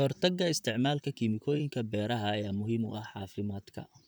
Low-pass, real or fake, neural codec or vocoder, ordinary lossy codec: none; fake; vocoder, 44.1 kHz, 128 mel bands, Pupu-Vocoder; none